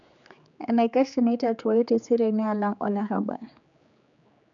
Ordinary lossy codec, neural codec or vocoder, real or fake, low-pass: none; codec, 16 kHz, 4 kbps, X-Codec, HuBERT features, trained on general audio; fake; 7.2 kHz